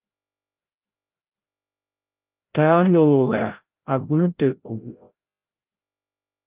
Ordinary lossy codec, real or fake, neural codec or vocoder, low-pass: Opus, 32 kbps; fake; codec, 16 kHz, 0.5 kbps, FreqCodec, larger model; 3.6 kHz